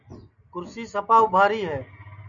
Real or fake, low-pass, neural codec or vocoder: real; 7.2 kHz; none